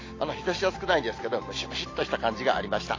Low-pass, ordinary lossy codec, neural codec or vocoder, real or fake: 7.2 kHz; AAC, 32 kbps; none; real